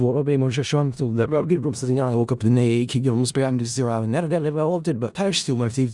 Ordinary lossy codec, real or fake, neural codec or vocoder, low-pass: Opus, 64 kbps; fake; codec, 16 kHz in and 24 kHz out, 0.4 kbps, LongCat-Audio-Codec, four codebook decoder; 10.8 kHz